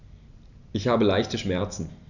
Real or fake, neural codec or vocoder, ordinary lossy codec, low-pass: real; none; none; 7.2 kHz